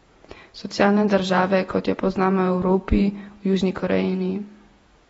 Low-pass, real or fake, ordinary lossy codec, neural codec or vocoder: 19.8 kHz; fake; AAC, 24 kbps; vocoder, 48 kHz, 128 mel bands, Vocos